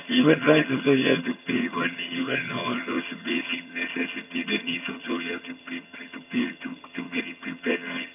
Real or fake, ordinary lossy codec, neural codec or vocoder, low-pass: fake; none; vocoder, 22.05 kHz, 80 mel bands, HiFi-GAN; 3.6 kHz